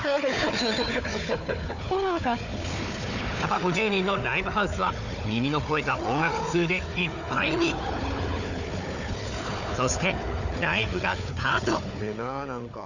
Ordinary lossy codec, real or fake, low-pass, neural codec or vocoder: none; fake; 7.2 kHz; codec, 16 kHz, 4 kbps, FunCodec, trained on Chinese and English, 50 frames a second